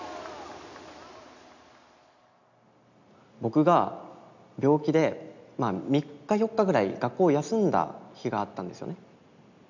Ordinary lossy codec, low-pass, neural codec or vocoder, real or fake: none; 7.2 kHz; none; real